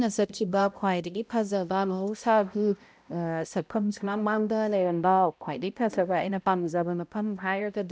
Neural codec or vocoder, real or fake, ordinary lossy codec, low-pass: codec, 16 kHz, 0.5 kbps, X-Codec, HuBERT features, trained on balanced general audio; fake; none; none